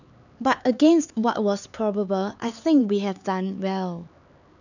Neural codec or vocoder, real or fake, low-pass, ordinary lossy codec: codec, 16 kHz, 4 kbps, X-Codec, HuBERT features, trained on LibriSpeech; fake; 7.2 kHz; none